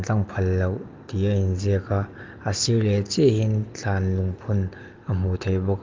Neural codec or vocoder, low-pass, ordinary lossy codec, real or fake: none; 7.2 kHz; Opus, 32 kbps; real